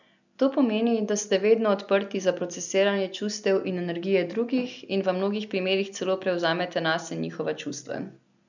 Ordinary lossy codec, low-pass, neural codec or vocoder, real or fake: none; 7.2 kHz; none; real